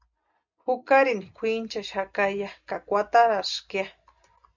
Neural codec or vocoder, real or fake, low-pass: none; real; 7.2 kHz